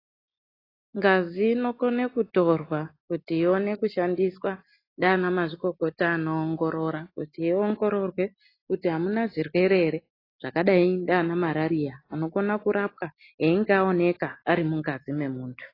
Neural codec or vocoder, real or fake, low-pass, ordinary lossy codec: none; real; 5.4 kHz; AAC, 24 kbps